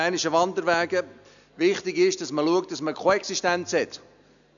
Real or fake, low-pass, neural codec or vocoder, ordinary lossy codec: real; 7.2 kHz; none; none